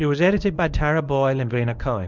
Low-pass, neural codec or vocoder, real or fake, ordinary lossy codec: 7.2 kHz; codec, 24 kHz, 0.9 kbps, WavTokenizer, medium speech release version 1; fake; Opus, 64 kbps